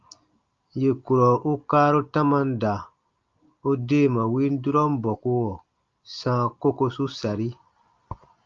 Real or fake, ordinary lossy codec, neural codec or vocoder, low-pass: real; Opus, 32 kbps; none; 7.2 kHz